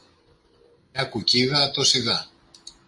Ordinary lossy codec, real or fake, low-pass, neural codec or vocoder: MP3, 48 kbps; real; 10.8 kHz; none